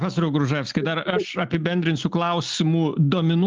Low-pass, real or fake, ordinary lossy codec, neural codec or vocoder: 7.2 kHz; real; Opus, 32 kbps; none